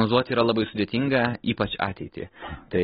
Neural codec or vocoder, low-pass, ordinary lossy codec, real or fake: none; 10.8 kHz; AAC, 16 kbps; real